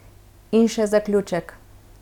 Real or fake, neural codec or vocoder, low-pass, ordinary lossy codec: real; none; 19.8 kHz; none